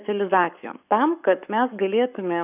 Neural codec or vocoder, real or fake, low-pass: codec, 44.1 kHz, 7.8 kbps, Pupu-Codec; fake; 3.6 kHz